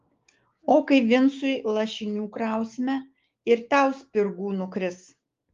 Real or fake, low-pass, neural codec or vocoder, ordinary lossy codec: fake; 7.2 kHz; codec, 16 kHz, 6 kbps, DAC; Opus, 32 kbps